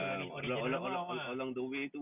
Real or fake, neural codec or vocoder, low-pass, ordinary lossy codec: real; none; 3.6 kHz; none